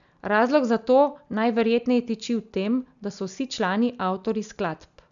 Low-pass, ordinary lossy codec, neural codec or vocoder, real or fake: 7.2 kHz; none; none; real